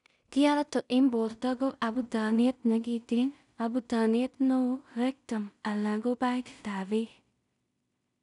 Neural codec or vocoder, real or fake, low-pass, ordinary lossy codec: codec, 16 kHz in and 24 kHz out, 0.4 kbps, LongCat-Audio-Codec, two codebook decoder; fake; 10.8 kHz; none